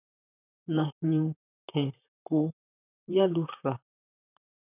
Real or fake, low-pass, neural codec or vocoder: fake; 3.6 kHz; vocoder, 44.1 kHz, 128 mel bands, Pupu-Vocoder